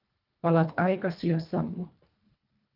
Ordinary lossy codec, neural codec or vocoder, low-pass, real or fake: Opus, 24 kbps; codec, 24 kHz, 1.5 kbps, HILCodec; 5.4 kHz; fake